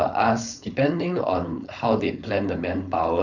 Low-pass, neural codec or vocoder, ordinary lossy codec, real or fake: 7.2 kHz; codec, 16 kHz, 4.8 kbps, FACodec; none; fake